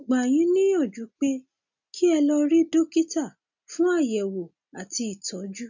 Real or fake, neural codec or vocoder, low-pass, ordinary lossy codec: real; none; 7.2 kHz; none